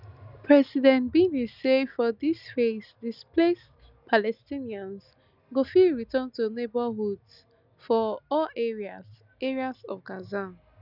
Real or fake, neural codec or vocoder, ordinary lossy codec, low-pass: real; none; none; 5.4 kHz